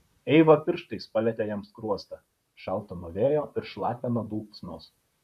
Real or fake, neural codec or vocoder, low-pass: fake; vocoder, 44.1 kHz, 128 mel bands, Pupu-Vocoder; 14.4 kHz